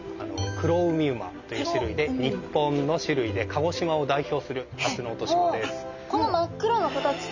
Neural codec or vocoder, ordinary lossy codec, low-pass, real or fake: none; none; 7.2 kHz; real